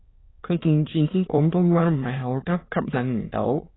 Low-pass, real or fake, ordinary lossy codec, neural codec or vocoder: 7.2 kHz; fake; AAC, 16 kbps; autoencoder, 22.05 kHz, a latent of 192 numbers a frame, VITS, trained on many speakers